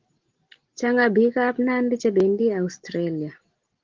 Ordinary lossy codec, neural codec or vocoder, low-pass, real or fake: Opus, 16 kbps; none; 7.2 kHz; real